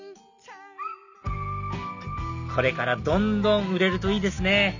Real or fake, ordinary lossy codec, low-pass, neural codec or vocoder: real; none; 7.2 kHz; none